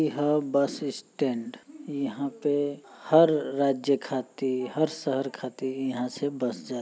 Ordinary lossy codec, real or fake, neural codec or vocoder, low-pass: none; real; none; none